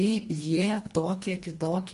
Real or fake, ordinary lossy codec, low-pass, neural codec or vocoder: fake; MP3, 48 kbps; 10.8 kHz; codec, 24 kHz, 1.5 kbps, HILCodec